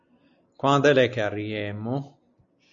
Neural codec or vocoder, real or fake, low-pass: none; real; 7.2 kHz